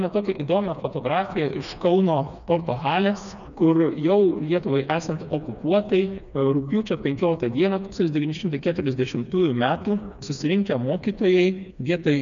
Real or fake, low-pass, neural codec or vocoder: fake; 7.2 kHz; codec, 16 kHz, 2 kbps, FreqCodec, smaller model